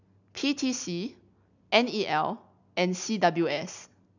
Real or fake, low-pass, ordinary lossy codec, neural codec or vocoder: real; 7.2 kHz; none; none